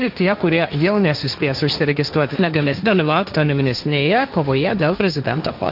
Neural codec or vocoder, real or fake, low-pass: codec, 16 kHz, 1.1 kbps, Voila-Tokenizer; fake; 5.4 kHz